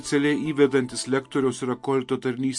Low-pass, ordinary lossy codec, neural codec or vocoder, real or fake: 10.8 kHz; MP3, 48 kbps; none; real